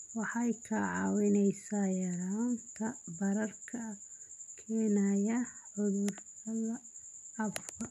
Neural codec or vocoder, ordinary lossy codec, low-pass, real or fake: none; none; 14.4 kHz; real